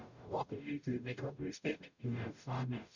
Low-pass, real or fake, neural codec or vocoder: 7.2 kHz; fake; codec, 44.1 kHz, 0.9 kbps, DAC